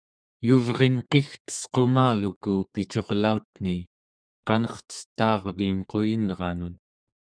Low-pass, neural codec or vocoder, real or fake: 9.9 kHz; codec, 32 kHz, 1.9 kbps, SNAC; fake